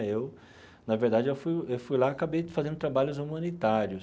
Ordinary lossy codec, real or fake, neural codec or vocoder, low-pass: none; real; none; none